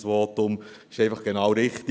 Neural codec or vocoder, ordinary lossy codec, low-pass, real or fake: none; none; none; real